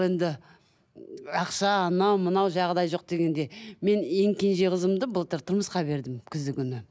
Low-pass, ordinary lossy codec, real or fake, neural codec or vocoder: none; none; real; none